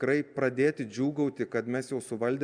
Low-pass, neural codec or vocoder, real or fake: 9.9 kHz; none; real